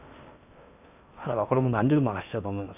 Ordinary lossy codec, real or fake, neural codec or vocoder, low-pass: none; fake; codec, 16 kHz in and 24 kHz out, 0.6 kbps, FocalCodec, streaming, 4096 codes; 3.6 kHz